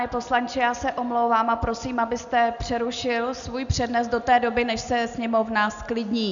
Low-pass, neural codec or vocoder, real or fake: 7.2 kHz; none; real